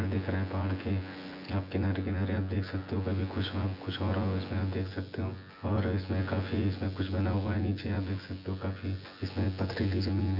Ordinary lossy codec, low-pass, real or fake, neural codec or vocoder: none; 5.4 kHz; fake; vocoder, 24 kHz, 100 mel bands, Vocos